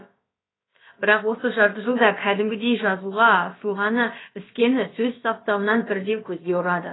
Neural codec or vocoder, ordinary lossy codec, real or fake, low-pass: codec, 16 kHz, about 1 kbps, DyCAST, with the encoder's durations; AAC, 16 kbps; fake; 7.2 kHz